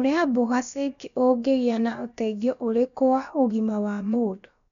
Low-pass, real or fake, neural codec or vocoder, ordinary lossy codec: 7.2 kHz; fake; codec, 16 kHz, about 1 kbps, DyCAST, with the encoder's durations; none